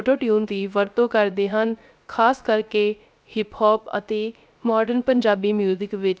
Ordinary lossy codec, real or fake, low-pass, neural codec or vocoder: none; fake; none; codec, 16 kHz, 0.3 kbps, FocalCodec